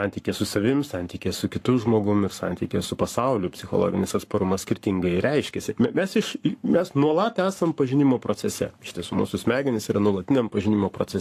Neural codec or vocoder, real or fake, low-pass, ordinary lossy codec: codec, 44.1 kHz, 7.8 kbps, Pupu-Codec; fake; 14.4 kHz; AAC, 64 kbps